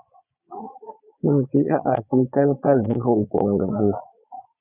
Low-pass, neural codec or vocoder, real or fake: 3.6 kHz; vocoder, 22.05 kHz, 80 mel bands, Vocos; fake